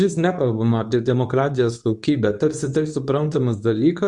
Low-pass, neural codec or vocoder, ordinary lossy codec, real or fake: 10.8 kHz; codec, 24 kHz, 0.9 kbps, WavTokenizer, medium speech release version 2; MP3, 96 kbps; fake